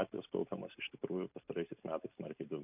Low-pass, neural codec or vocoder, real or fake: 3.6 kHz; none; real